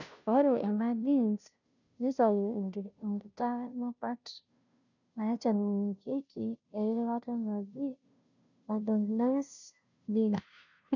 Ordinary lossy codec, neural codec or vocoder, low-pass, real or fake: none; codec, 16 kHz, 0.5 kbps, FunCodec, trained on Chinese and English, 25 frames a second; 7.2 kHz; fake